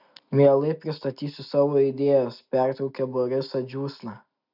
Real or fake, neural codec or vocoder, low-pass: real; none; 5.4 kHz